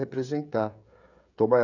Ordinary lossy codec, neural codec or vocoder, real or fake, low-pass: none; codec, 16 kHz, 16 kbps, FreqCodec, smaller model; fake; 7.2 kHz